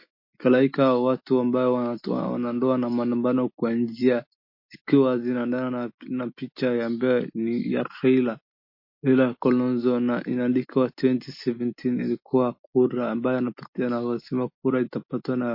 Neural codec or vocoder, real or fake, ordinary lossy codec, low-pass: none; real; MP3, 32 kbps; 5.4 kHz